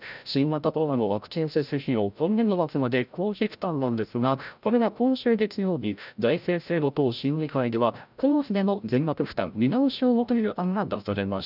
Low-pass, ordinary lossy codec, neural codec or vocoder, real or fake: 5.4 kHz; none; codec, 16 kHz, 0.5 kbps, FreqCodec, larger model; fake